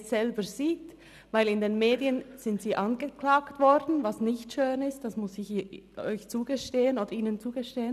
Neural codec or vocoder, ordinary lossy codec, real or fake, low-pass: none; none; real; 14.4 kHz